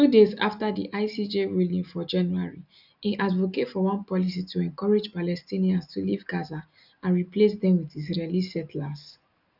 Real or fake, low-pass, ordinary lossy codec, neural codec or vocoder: real; 5.4 kHz; none; none